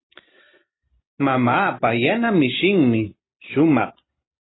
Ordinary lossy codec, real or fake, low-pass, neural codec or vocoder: AAC, 16 kbps; real; 7.2 kHz; none